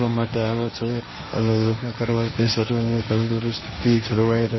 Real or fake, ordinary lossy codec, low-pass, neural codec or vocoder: fake; MP3, 24 kbps; 7.2 kHz; codec, 16 kHz, 1.1 kbps, Voila-Tokenizer